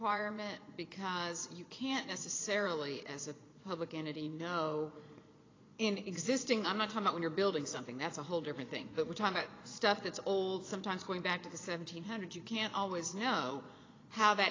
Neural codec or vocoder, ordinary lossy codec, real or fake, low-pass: vocoder, 22.05 kHz, 80 mel bands, Vocos; AAC, 32 kbps; fake; 7.2 kHz